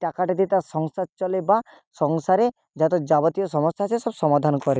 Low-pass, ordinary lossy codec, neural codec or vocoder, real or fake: none; none; none; real